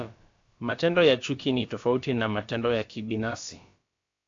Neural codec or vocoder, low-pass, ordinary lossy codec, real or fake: codec, 16 kHz, about 1 kbps, DyCAST, with the encoder's durations; 7.2 kHz; AAC, 48 kbps; fake